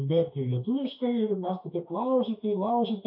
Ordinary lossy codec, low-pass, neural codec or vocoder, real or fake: MP3, 32 kbps; 5.4 kHz; autoencoder, 48 kHz, 32 numbers a frame, DAC-VAE, trained on Japanese speech; fake